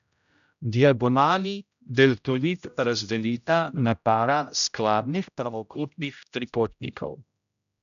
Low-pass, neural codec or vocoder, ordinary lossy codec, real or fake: 7.2 kHz; codec, 16 kHz, 0.5 kbps, X-Codec, HuBERT features, trained on general audio; none; fake